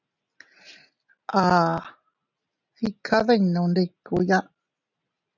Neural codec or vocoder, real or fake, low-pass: none; real; 7.2 kHz